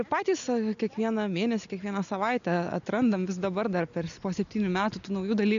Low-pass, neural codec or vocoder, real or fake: 7.2 kHz; none; real